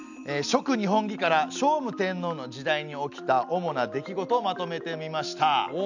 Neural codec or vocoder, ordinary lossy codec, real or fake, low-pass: none; none; real; 7.2 kHz